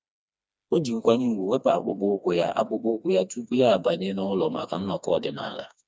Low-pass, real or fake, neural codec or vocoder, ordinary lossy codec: none; fake; codec, 16 kHz, 2 kbps, FreqCodec, smaller model; none